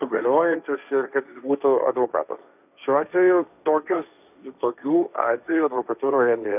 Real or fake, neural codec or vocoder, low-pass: fake; codec, 16 kHz, 1.1 kbps, Voila-Tokenizer; 3.6 kHz